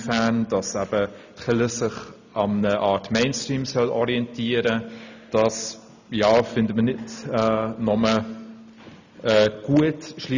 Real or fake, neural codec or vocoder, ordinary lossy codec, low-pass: real; none; none; 7.2 kHz